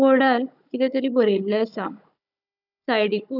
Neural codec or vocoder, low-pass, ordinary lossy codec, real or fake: codec, 16 kHz, 16 kbps, FunCodec, trained on Chinese and English, 50 frames a second; 5.4 kHz; none; fake